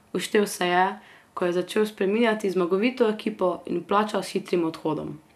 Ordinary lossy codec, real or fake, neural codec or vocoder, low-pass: none; real; none; 14.4 kHz